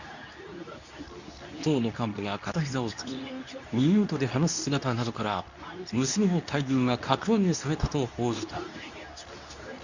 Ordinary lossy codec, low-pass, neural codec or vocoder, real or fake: none; 7.2 kHz; codec, 24 kHz, 0.9 kbps, WavTokenizer, medium speech release version 2; fake